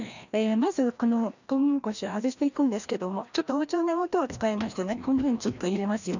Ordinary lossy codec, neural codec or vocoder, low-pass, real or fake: none; codec, 16 kHz, 1 kbps, FreqCodec, larger model; 7.2 kHz; fake